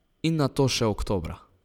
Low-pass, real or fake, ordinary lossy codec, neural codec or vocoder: 19.8 kHz; real; none; none